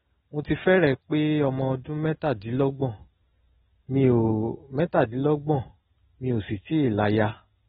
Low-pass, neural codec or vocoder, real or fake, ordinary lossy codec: 19.8 kHz; none; real; AAC, 16 kbps